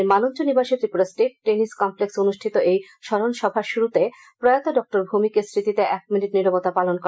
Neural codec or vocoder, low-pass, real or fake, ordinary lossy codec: none; none; real; none